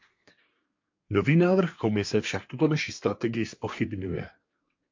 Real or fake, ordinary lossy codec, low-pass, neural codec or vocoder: fake; MP3, 48 kbps; 7.2 kHz; codec, 24 kHz, 1 kbps, SNAC